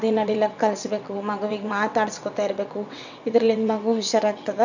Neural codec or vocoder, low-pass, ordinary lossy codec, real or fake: vocoder, 22.05 kHz, 80 mel bands, WaveNeXt; 7.2 kHz; none; fake